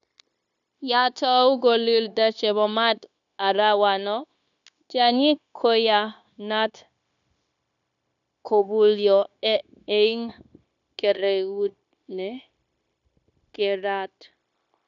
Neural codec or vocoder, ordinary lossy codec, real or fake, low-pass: codec, 16 kHz, 0.9 kbps, LongCat-Audio-Codec; none; fake; 7.2 kHz